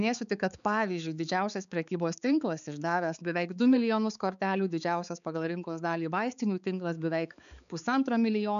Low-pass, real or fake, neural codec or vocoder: 7.2 kHz; fake; codec, 16 kHz, 4 kbps, X-Codec, HuBERT features, trained on balanced general audio